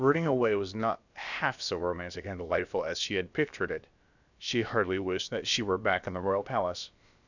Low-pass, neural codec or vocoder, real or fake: 7.2 kHz; codec, 16 kHz, about 1 kbps, DyCAST, with the encoder's durations; fake